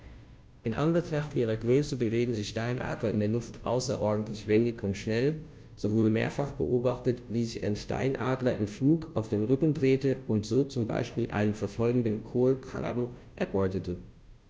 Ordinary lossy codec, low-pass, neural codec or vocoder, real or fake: none; none; codec, 16 kHz, 0.5 kbps, FunCodec, trained on Chinese and English, 25 frames a second; fake